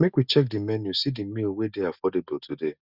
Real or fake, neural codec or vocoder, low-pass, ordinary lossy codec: real; none; 5.4 kHz; Opus, 64 kbps